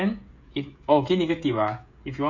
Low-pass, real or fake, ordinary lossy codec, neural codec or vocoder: 7.2 kHz; fake; none; codec, 16 kHz, 16 kbps, FreqCodec, smaller model